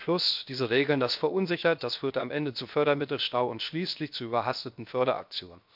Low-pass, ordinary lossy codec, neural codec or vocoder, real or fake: 5.4 kHz; MP3, 48 kbps; codec, 16 kHz, 0.7 kbps, FocalCodec; fake